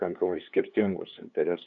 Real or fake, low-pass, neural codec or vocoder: fake; 7.2 kHz; codec, 16 kHz, 2 kbps, FunCodec, trained on LibriTTS, 25 frames a second